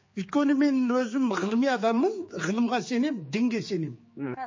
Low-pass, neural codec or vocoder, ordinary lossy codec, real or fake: 7.2 kHz; codec, 16 kHz, 4 kbps, X-Codec, HuBERT features, trained on general audio; MP3, 32 kbps; fake